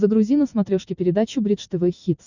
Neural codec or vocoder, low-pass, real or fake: none; 7.2 kHz; real